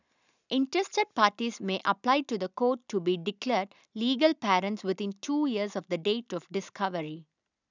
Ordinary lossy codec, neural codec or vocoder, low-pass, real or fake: none; none; 7.2 kHz; real